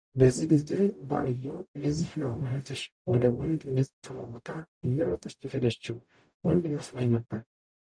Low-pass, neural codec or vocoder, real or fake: 9.9 kHz; codec, 44.1 kHz, 0.9 kbps, DAC; fake